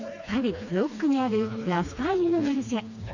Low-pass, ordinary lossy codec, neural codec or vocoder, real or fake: 7.2 kHz; none; codec, 16 kHz, 2 kbps, FreqCodec, smaller model; fake